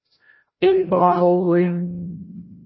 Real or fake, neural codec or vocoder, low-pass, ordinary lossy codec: fake; codec, 16 kHz, 0.5 kbps, FreqCodec, larger model; 7.2 kHz; MP3, 24 kbps